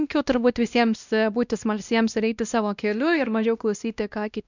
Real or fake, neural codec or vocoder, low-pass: fake; codec, 16 kHz, 1 kbps, X-Codec, WavLM features, trained on Multilingual LibriSpeech; 7.2 kHz